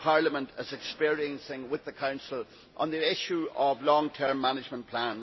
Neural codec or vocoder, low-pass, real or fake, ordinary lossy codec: none; 7.2 kHz; real; MP3, 24 kbps